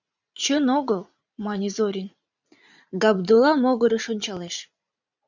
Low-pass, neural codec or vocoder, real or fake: 7.2 kHz; vocoder, 44.1 kHz, 80 mel bands, Vocos; fake